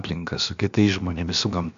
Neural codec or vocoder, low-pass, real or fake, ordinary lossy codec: codec, 16 kHz, about 1 kbps, DyCAST, with the encoder's durations; 7.2 kHz; fake; MP3, 64 kbps